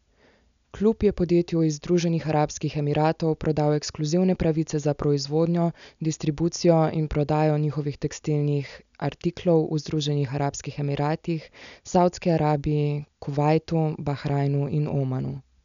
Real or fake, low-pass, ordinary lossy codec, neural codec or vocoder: real; 7.2 kHz; none; none